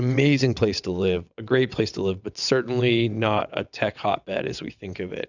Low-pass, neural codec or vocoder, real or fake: 7.2 kHz; vocoder, 22.05 kHz, 80 mel bands, WaveNeXt; fake